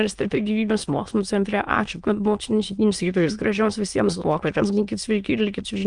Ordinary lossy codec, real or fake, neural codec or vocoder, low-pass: Opus, 32 kbps; fake; autoencoder, 22.05 kHz, a latent of 192 numbers a frame, VITS, trained on many speakers; 9.9 kHz